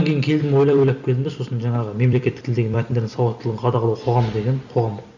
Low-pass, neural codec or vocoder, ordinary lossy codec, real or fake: 7.2 kHz; vocoder, 44.1 kHz, 128 mel bands every 256 samples, BigVGAN v2; none; fake